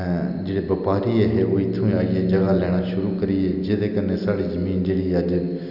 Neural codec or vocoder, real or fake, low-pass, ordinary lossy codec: none; real; 5.4 kHz; none